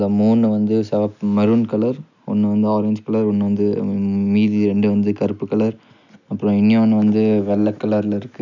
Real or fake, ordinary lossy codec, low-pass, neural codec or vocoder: real; none; 7.2 kHz; none